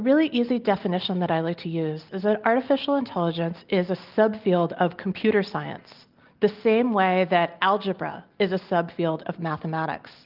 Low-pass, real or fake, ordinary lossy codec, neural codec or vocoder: 5.4 kHz; real; Opus, 24 kbps; none